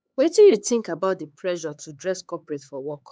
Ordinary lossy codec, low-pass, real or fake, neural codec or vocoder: none; none; fake; codec, 16 kHz, 4 kbps, X-Codec, HuBERT features, trained on LibriSpeech